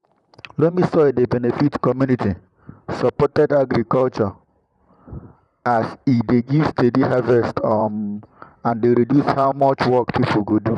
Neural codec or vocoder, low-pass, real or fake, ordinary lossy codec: vocoder, 44.1 kHz, 128 mel bands, Pupu-Vocoder; 10.8 kHz; fake; none